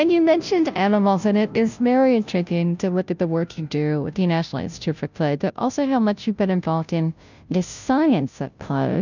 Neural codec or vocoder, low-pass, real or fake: codec, 16 kHz, 0.5 kbps, FunCodec, trained on Chinese and English, 25 frames a second; 7.2 kHz; fake